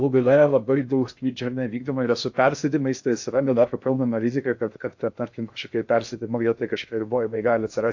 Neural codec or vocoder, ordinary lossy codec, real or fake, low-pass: codec, 16 kHz in and 24 kHz out, 0.6 kbps, FocalCodec, streaming, 2048 codes; AAC, 48 kbps; fake; 7.2 kHz